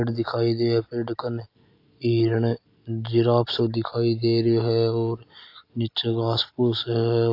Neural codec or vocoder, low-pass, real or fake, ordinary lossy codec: none; 5.4 kHz; real; AAC, 32 kbps